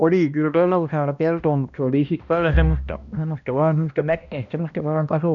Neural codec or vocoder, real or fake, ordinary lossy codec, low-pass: codec, 16 kHz, 1 kbps, X-Codec, HuBERT features, trained on balanced general audio; fake; none; 7.2 kHz